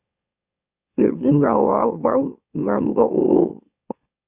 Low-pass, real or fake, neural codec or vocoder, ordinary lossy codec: 3.6 kHz; fake; autoencoder, 44.1 kHz, a latent of 192 numbers a frame, MeloTTS; Opus, 64 kbps